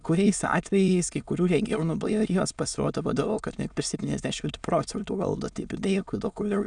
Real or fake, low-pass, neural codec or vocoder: fake; 9.9 kHz; autoencoder, 22.05 kHz, a latent of 192 numbers a frame, VITS, trained on many speakers